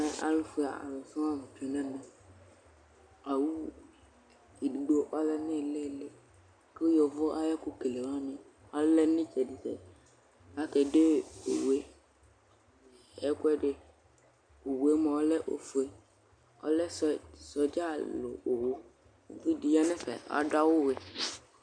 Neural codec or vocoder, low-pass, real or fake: none; 9.9 kHz; real